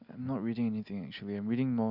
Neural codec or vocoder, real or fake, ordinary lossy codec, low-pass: none; real; none; 5.4 kHz